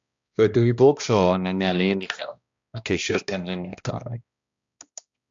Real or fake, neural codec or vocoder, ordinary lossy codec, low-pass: fake; codec, 16 kHz, 1 kbps, X-Codec, HuBERT features, trained on balanced general audio; AAC, 64 kbps; 7.2 kHz